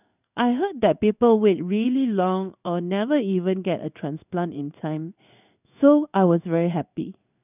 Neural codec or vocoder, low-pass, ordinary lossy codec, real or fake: codec, 16 kHz in and 24 kHz out, 1 kbps, XY-Tokenizer; 3.6 kHz; none; fake